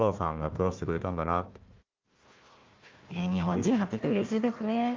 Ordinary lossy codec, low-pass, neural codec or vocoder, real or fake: Opus, 32 kbps; 7.2 kHz; codec, 16 kHz, 1 kbps, FunCodec, trained on Chinese and English, 50 frames a second; fake